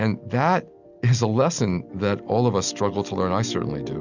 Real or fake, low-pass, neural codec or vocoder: real; 7.2 kHz; none